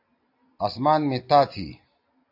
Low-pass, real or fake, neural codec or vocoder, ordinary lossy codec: 5.4 kHz; real; none; AAC, 32 kbps